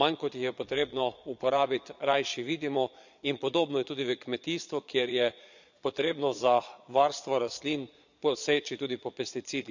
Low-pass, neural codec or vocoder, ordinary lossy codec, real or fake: 7.2 kHz; vocoder, 22.05 kHz, 80 mel bands, Vocos; none; fake